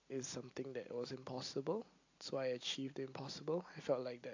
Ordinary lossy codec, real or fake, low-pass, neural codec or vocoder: AAC, 48 kbps; real; 7.2 kHz; none